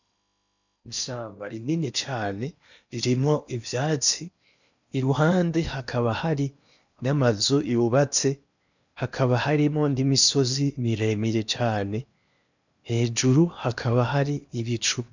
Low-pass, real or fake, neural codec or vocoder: 7.2 kHz; fake; codec, 16 kHz in and 24 kHz out, 0.8 kbps, FocalCodec, streaming, 65536 codes